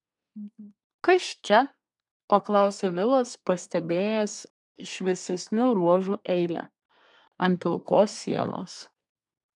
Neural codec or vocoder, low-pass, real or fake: codec, 24 kHz, 1 kbps, SNAC; 10.8 kHz; fake